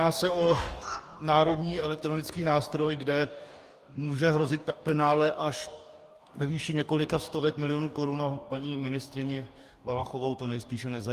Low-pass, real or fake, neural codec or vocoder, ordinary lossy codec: 14.4 kHz; fake; codec, 44.1 kHz, 2.6 kbps, DAC; Opus, 32 kbps